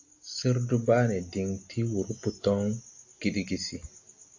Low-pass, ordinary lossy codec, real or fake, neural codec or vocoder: 7.2 kHz; AAC, 48 kbps; real; none